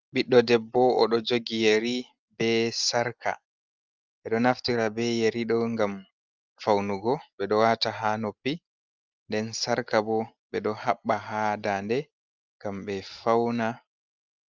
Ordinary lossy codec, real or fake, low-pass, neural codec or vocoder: Opus, 24 kbps; real; 7.2 kHz; none